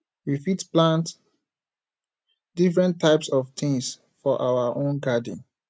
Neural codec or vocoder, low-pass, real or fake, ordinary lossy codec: none; none; real; none